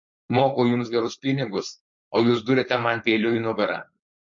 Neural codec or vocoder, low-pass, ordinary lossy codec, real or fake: codec, 16 kHz, 4.8 kbps, FACodec; 7.2 kHz; MP3, 48 kbps; fake